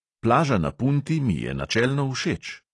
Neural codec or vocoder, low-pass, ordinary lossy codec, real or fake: none; 10.8 kHz; AAC, 32 kbps; real